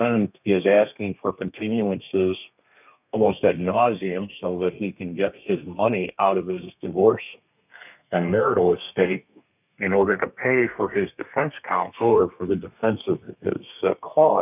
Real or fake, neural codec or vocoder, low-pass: fake; codec, 32 kHz, 1.9 kbps, SNAC; 3.6 kHz